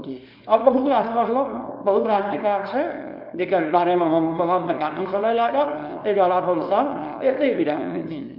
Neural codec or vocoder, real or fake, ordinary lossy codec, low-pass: codec, 24 kHz, 0.9 kbps, WavTokenizer, small release; fake; MP3, 48 kbps; 5.4 kHz